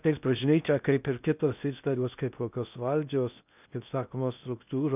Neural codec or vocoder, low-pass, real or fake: codec, 16 kHz in and 24 kHz out, 0.6 kbps, FocalCodec, streaming, 4096 codes; 3.6 kHz; fake